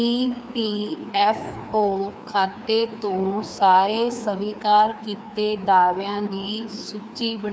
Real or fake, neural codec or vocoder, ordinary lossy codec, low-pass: fake; codec, 16 kHz, 2 kbps, FreqCodec, larger model; none; none